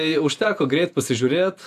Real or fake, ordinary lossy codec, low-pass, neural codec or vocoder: fake; AAC, 96 kbps; 14.4 kHz; vocoder, 48 kHz, 128 mel bands, Vocos